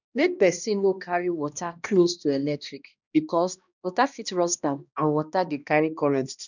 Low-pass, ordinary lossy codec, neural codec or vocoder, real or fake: 7.2 kHz; none; codec, 16 kHz, 1 kbps, X-Codec, HuBERT features, trained on balanced general audio; fake